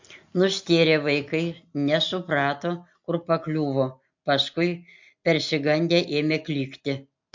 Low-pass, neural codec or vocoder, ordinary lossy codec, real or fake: 7.2 kHz; none; MP3, 48 kbps; real